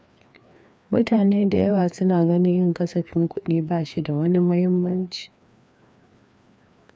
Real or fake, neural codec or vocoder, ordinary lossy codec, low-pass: fake; codec, 16 kHz, 2 kbps, FreqCodec, larger model; none; none